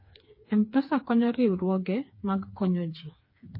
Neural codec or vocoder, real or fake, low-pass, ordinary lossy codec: codec, 16 kHz, 4 kbps, FreqCodec, smaller model; fake; 5.4 kHz; MP3, 24 kbps